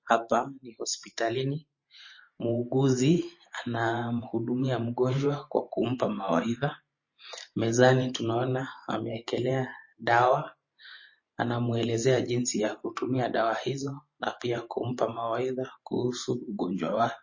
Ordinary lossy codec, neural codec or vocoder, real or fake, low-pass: MP3, 32 kbps; vocoder, 44.1 kHz, 128 mel bands every 512 samples, BigVGAN v2; fake; 7.2 kHz